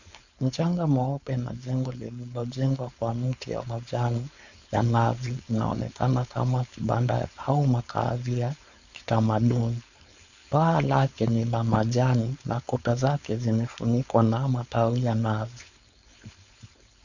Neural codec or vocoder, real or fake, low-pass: codec, 16 kHz, 4.8 kbps, FACodec; fake; 7.2 kHz